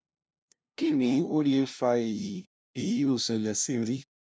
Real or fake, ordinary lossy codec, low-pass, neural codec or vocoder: fake; none; none; codec, 16 kHz, 0.5 kbps, FunCodec, trained on LibriTTS, 25 frames a second